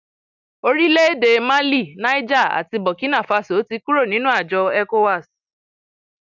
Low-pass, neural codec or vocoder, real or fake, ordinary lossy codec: 7.2 kHz; none; real; none